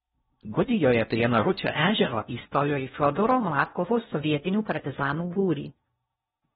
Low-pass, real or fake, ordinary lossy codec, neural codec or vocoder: 10.8 kHz; fake; AAC, 16 kbps; codec, 16 kHz in and 24 kHz out, 0.6 kbps, FocalCodec, streaming, 4096 codes